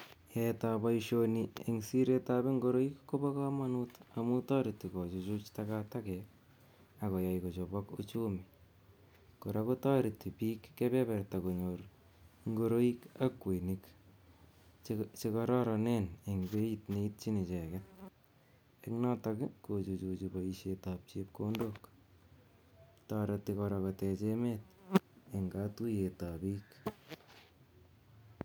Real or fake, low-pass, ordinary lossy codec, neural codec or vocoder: real; none; none; none